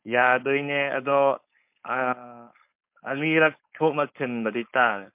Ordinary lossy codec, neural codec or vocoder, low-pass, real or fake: MP3, 32 kbps; codec, 16 kHz, 4.8 kbps, FACodec; 3.6 kHz; fake